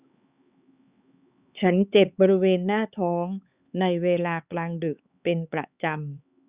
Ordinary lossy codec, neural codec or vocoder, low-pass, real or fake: Opus, 64 kbps; codec, 16 kHz, 4 kbps, X-Codec, HuBERT features, trained on LibriSpeech; 3.6 kHz; fake